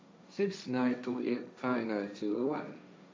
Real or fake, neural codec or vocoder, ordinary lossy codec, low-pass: fake; codec, 16 kHz, 1.1 kbps, Voila-Tokenizer; none; none